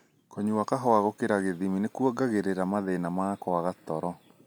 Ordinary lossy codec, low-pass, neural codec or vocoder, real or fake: none; none; none; real